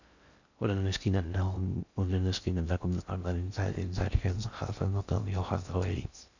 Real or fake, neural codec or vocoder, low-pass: fake; codec, 16 kHz in and 24 kHz out, 0.6 kbps, FocalCodec, streaming, 4096 codes; 7.2 kHz